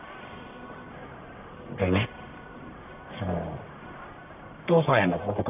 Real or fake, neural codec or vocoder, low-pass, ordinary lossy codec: fake; codec, 44.1 kHz, 1.7 kbps, Pupu-Codec; 3.6 kHz; none